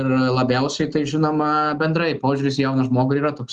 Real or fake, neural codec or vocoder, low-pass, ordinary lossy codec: real; none; 10.8 kHz; Opus, 32 kbps